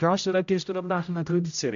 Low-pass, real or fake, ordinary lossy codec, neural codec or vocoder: 7.2 kHz; fake; AAC, 96 kbps; codec, 16 kHz, 0.5 kbps, X-Codec, HuBERT features, trained on general audio